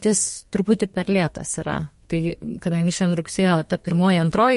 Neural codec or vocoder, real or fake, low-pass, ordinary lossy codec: codec, 32 kHz, 1.9 kbps, SNAC; fake; 14.4 kHz; MP3, 48 kbps